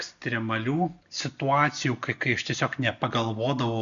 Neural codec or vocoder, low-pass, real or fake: none; 7.2 kHz; real